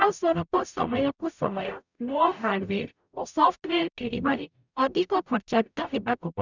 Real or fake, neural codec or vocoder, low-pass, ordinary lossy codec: fake; codec, 44.1 kHz, 0.9 kbps, DAC; 7.2 kHz; none